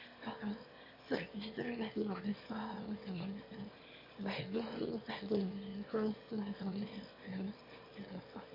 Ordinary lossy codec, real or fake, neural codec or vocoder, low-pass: MP3, 32 kbps; fake; autoencoder, 22.05 kHz, a latent of 192 numbers a frame, VITS, trained on one speaker; 5.4 kHz